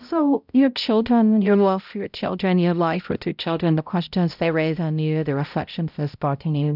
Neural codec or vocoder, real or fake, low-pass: codec, 16 kHz, 0.5 kbps, X-Codec, HuBERT features, trained on balanced general audio; fake; 5.4 kHz